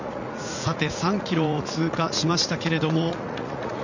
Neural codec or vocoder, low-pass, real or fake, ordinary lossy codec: vocoder, 44.1 kHz, 80 mel bands, Vocos; 7.2 kHz; fake; none